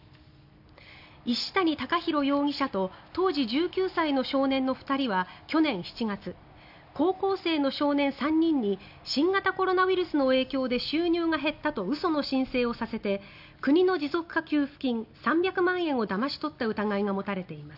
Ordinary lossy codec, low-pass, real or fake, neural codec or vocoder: none; 5.4 kHz; real; none